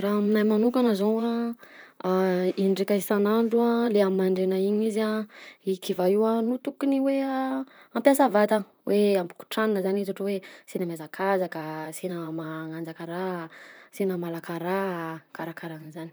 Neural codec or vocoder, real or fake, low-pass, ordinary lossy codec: vocoder, 44.1 kHz, 128 mel bands, Pupu-Vocoder; fake; none; none